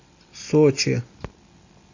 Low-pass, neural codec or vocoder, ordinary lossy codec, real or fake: 7.2 kHz; none; AAC, 48 kbps; real